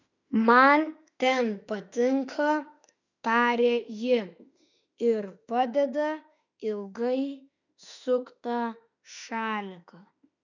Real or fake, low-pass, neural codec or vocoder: fake; 7.2 kHz; autoencoder, 48 kHz, 32 numbers a frame, DAC-VAE, trained on Japanese speech